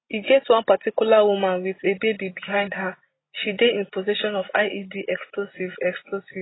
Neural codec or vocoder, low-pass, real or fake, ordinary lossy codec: none; 7.2 kHz; real; AAC, 16 kbps